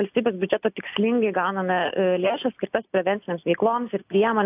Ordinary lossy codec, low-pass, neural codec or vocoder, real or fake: AAC, 32 kbps; 3.6 kHz; none; real